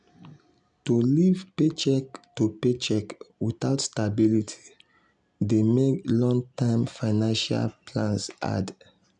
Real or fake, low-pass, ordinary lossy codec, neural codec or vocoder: real; 9.9 kHz; none; none